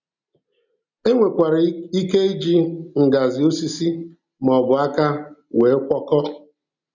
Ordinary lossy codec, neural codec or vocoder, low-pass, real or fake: none; none; 7.2 kHz; real